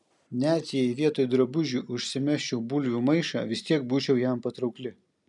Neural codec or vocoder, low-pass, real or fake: none; 10.8 kHz; real